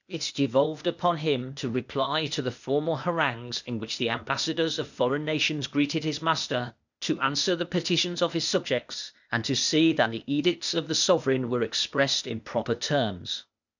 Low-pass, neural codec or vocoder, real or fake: 7.2 kHz; codec, 16 kHz, 0.8 kbps, ZipCodec; fake